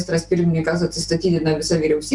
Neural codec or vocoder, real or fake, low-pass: none; real; 10.8 kHz